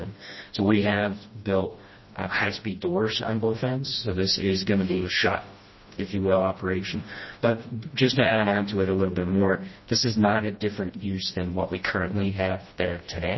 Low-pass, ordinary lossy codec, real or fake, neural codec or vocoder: 7.2 kHz; MP3, 24 kbps; fake; codec, 16 kHz, 1 kbps, FreqCodec, smaller model